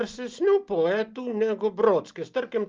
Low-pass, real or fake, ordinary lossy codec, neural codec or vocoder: 7.2 kHz; real; Opus, 24 kbps; none